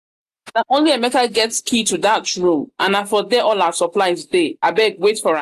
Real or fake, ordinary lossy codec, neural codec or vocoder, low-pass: real; AAC, 96 kbps; none; 14.4 kHz